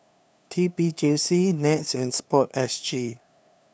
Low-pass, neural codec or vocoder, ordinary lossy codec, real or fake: none; codec, 16 kHz, 2 kbps, FunCodec, trained on LibriTTS, 25 frames a second; none; fake